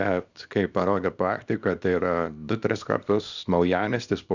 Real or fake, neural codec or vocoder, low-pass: fake; codec, 24 kHz, 0.9 kbps, WavTokenizer, small release; 7.2 kHz